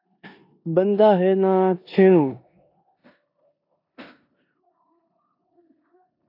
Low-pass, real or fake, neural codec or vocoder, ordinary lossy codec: 5.4 kHz; fake; codec, 16 kHz in and 24 kHz out, 0.9 kbps, LongCat-Audio-Codec, four codebook decoder; AAC, 32 kbps